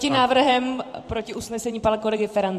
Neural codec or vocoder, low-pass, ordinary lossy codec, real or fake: vocoder, 44.1 kHz, 128 mel bands every 512 samples, BigVGAN v2; 14.4 kHz; MP3, 64 kbps; fake